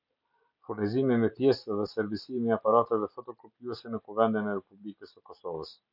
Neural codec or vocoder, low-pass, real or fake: none; 5.4 kHz; real